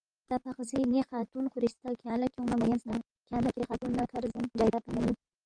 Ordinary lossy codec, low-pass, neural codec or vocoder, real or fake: Opus, 32 kbps; 9.9 kHz; vocoder, 44.1 kHz, 128 mel bands, Pupu-Vocoder; fake